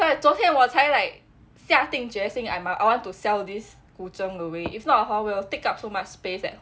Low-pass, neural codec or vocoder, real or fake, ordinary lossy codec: none; none; real; none